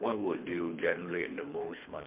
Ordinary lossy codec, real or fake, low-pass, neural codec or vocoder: MP3, 32 kbps; fake; 3.6 kHz; codec, 24 kHz, 3 kbps, HILCodec